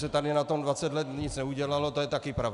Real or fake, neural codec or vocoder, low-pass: real; none; 10.8 kHz